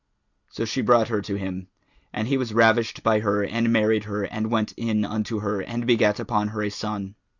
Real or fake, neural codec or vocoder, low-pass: real; none; 7.2 kHz